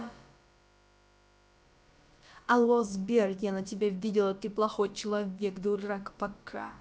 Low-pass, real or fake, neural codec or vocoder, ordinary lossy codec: none; fake; codec, 16 kHz, about 1 kbps, DyCAST, with the encoder's durations; none